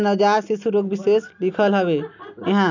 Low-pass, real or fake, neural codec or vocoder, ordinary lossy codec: 7.2 kHz; real; none; none